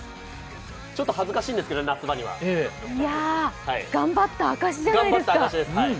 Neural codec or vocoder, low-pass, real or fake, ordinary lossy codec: none; none; real; none